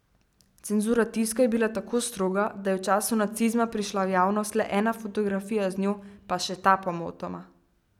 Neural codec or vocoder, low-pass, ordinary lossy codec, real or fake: none; 19.8 kHz; none; real